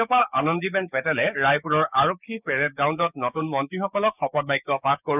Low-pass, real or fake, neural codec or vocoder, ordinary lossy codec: 3.6 kHz; fake; codec, 16 kHz, 6 kbps, DAC; none